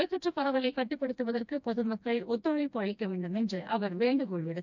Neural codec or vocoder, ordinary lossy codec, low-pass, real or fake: codec, 16 kHz, 1 kbps, FreqCodec, smaller model; none; 7.2 kHz; fake